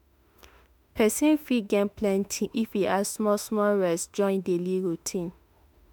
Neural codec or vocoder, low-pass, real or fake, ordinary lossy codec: autoencoder, 48 kHz, 32 numbers a frame, DAC-VAE, trained on Japanese speech; none; fake; none